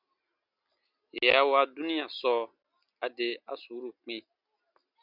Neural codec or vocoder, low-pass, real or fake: none; 5.4 kHz; real